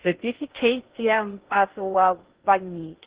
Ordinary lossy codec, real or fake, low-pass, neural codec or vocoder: Opus, 16 kbps; fake; 3.6 kHz; codec, 16 kHz in and 24 kHz out, 0.6 kbps, FocalCodec, streaming, 2048 codes